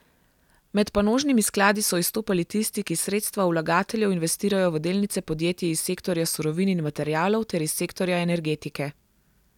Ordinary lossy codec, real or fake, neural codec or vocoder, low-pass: none; real; none; 19.8 kHz